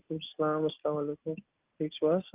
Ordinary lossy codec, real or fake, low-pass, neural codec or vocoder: Opus, 24 kbps; real; 3.6 kHz; none